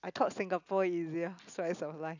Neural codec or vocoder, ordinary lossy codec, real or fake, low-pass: none; none; real; 7.2 kHz